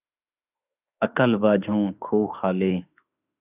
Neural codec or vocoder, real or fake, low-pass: autoencoder, 48 kHz, 32 numbers a frame, DAC-VAE, trained on Japanese speech; fake; 3.6 kHz